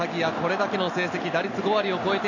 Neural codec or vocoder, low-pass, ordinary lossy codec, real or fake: none; 7.2 kHz; none; real